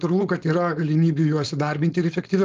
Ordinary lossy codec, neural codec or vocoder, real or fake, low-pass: Opus, 32 kbps; codec, 16 kHz, 4.8 kbps, FACodec; fake; 7.2 kHz